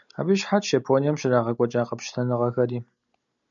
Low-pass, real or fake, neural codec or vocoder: 7.2 kHz; real; none